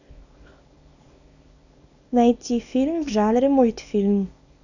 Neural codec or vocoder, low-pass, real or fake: codec, 24 kHz, 0.9 kbps, WavTokenizer, small release; 7.2 kHz; fake